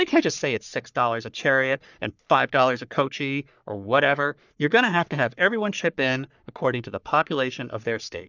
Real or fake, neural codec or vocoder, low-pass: fake; codec, 44.1 kHz, 3.4 kbps, Pupu-Codec; 7.2 kHz